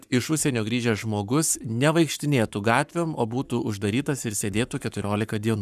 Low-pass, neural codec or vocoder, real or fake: 14.4 kHz; codec, 44.1 kHz, 7.8 kbps, Pupu-Codec; fake